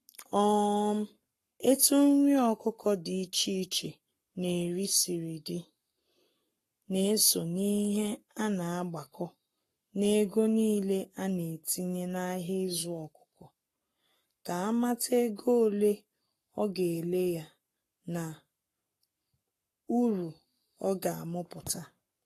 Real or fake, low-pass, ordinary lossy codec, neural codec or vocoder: fake; 14.4 kHz; AAC, 48 kbps; codec, 44.1 kHz, 7.8 kbps, Pupu-Codec